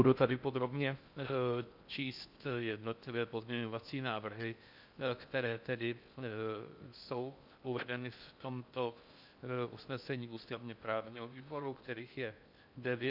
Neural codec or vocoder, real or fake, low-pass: codec, 16 kHz in and 24 kHz out, 0.6 kbps, FocalCodec, streaming, 4096 codes; fake; 5.4 kHz